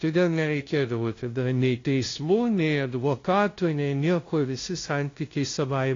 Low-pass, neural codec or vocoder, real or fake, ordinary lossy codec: 7.2 kHz; codec, 16 kHz, 0.5 kbps, FunCodec, trained on LibriTTS, 25 frames a second; fake; AAC, 32 kbps